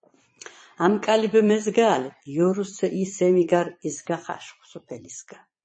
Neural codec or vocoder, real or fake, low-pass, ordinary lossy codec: vocoder, 22.05 kHz, 80 mel bands, Vocos; fake; 9.9 kHz; MP3, 32 kbps